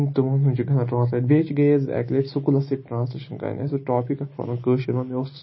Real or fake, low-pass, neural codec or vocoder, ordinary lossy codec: real; 7.2 kHz; none; MP3, 24 kbps